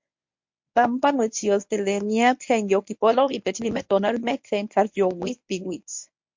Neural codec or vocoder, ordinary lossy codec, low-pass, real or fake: codec, 24 kHz, 0.9 kbps, WavTokenizer, medium speech release version 1; MP3, 48 kbps; 7.2 kHz; fake